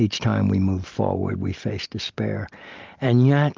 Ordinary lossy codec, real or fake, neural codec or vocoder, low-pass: Opus, 24 kbps; real; none; 7.2 kHz